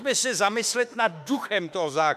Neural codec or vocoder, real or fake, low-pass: autoencoder, 48 kHz, 32 numbers a frame, DAC-VAE, trained on Japanese speech; fake; 14.4 kHz